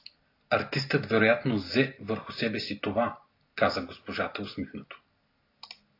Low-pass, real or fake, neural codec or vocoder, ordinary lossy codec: 5.4 kHz; real; none; AAC, 32 kbps